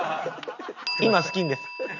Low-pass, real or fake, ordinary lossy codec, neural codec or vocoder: 7.2 kHz; real; none; none